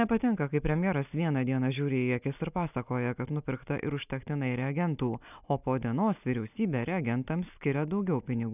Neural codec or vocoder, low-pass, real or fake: none; 3.6 kHz; real